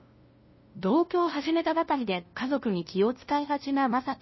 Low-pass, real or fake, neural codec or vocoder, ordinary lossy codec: 7.2 kHz; fake; codec, 16 kHz, 0.5 kbps, FunCodec, trained on LibriTTS, 25 frames a second; MP3, 24 kbps